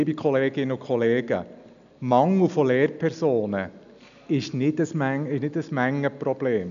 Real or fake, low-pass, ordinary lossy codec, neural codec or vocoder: real; 7.2 kHz; none; none